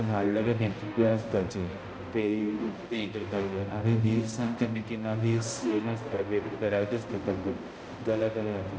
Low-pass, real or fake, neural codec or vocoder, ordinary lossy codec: none; fake; codec, 16 kHz, 0.5 kbps, X-Codec, HuBERT features, trained on balanced general audio; none